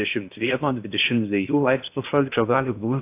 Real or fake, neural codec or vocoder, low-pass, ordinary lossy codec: fake; codec, 16 kHz in and 24 kHz out, 0.6 kbps, FocalCodec, streaming, 2048 codes; 3.6 kHz; AAC, 32 kbps